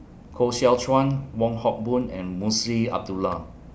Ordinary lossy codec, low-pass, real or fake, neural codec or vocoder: none; none; real; none